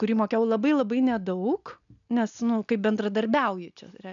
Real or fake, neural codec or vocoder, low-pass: real; none; 7.2 kHz